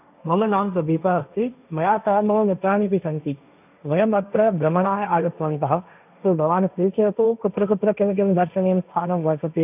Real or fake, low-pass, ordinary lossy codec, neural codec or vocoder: fake; 3.6 kHz; none; codec, 16 kHz, 1.1 kbps, Voila-Tokenizer